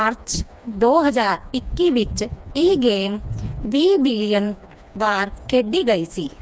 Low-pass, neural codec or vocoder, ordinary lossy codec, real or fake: none; codec, 16 kHz, 2 kbps, FreqCodec, smaller model; none; fake